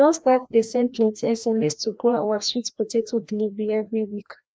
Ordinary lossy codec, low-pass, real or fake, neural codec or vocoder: none; none; fake; codec, 16 kHz, 1 kbps, FreqCodec, larger model